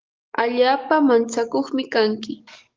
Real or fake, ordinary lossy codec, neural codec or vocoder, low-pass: fake; Opus, 24 kbps; codec, 44.1 kHz, 7.8 kbps, DAC; 7.2 kHz